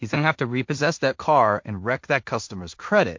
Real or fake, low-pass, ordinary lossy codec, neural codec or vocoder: fake; 7.2 kHz; MP3, 48 kbps; codec, 16 kHz in and 24 kHz out, 0.4 kbps, LongCat-Audio-Codec, two codebook decoder